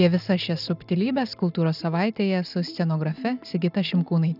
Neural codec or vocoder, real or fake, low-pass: none; real; 5.4 kHz